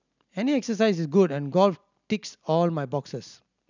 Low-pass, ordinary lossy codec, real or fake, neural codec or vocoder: 7.2 kHz; none; real; none